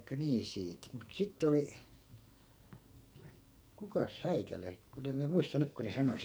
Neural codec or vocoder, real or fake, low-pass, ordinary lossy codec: codec, 44.1 kHz, 2.6 kbps, SNAC; fake; none; none